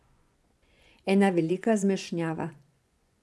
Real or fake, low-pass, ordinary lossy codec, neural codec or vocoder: fake; none; none; vocoder, 24 kHz, 100 mel bands, Vocos